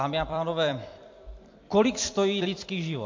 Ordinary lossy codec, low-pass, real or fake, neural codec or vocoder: MP3, 48 kbps; 7.2 kHz; fake; vocoder, 44.1 kHz, 128 mel bands every 256 samples, BigVGAN v2